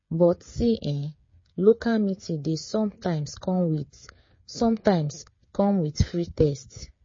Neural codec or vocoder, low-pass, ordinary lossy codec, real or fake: codec, 16 kHz, 8 kbps, FreqCodec, smaller model; 7.2 kHz; MP3, 32 kbps; fake